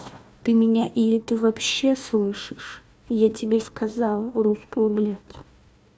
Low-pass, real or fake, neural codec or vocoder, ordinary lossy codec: none; fake; codec, 16 kHz, 1 kbps, FunCodec, trained on Chinese and English, 50 frames a second; none